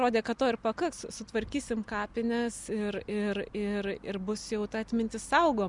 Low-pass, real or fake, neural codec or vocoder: 10.8 kHz; real; none